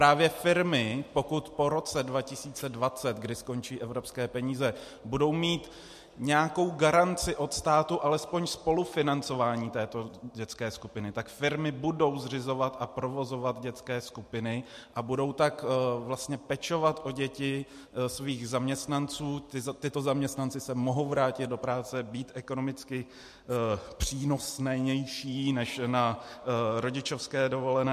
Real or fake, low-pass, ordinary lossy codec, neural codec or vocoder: real; 14.4 kHz; MP3, 64 kbps; none